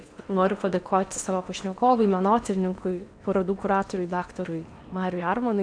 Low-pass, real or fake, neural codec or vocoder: 9.9 kHz; fake; codec, 16 kHz in and 24 kHz out, 0.8 kbps, FocalCodec, streaming, 65536 codes